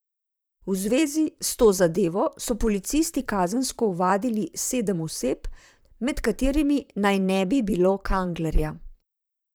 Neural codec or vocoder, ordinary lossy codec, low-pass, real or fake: vocoder, 44.1 kHz, 128 mel bands, Pupu-Vocoder; none; none; fake